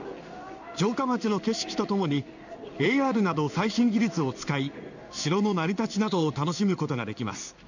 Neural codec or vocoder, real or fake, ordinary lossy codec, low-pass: codec, 16 kHz in and 24 kHz out, 1 kbps, XY-Tokenizer; fake; none; 7.2 kHz